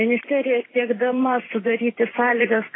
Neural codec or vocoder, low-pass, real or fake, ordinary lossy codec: vocoder, 44.1 kHz, 128 mel bands every 512 samples, BigVGAN v2; 7.2 kHz; fake; AAC, 16 kbps